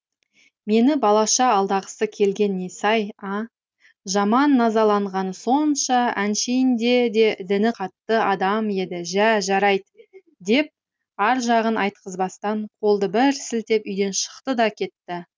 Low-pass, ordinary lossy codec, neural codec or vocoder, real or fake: none; none; none; real